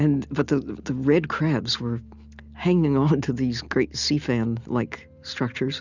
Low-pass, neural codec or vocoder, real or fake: 7.2 kHz; none; real